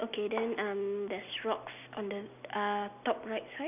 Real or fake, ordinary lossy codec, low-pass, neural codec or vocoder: real; none; 3.6 kHz; none